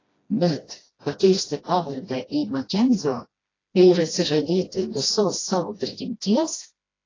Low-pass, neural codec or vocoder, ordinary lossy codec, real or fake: 7.2 kHz; codec, 16 kHz, 1 kbps, FreqCodec, smaller model; AAC, 32 kbps; fake